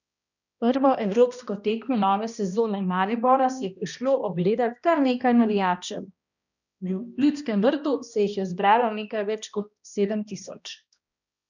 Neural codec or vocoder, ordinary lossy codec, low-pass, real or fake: codec, 16 kHz, 1 kbps, X-Codec, HuBERT features, trained on balanced general audio; none; 7.2 kHz; fake